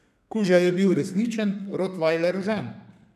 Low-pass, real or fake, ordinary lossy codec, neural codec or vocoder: 14.4 kHz; fake; none; codec, 32 kHz, 1.9 kbps, SNAC